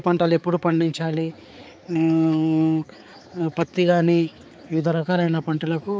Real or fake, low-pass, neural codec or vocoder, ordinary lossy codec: fake; none; codec, 16 kHz, 4 kbps, X-Codec, HuBERT features, trained on balanced general audio; none